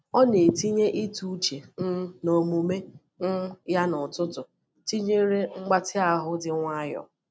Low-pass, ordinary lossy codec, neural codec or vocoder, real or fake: none; none; none; real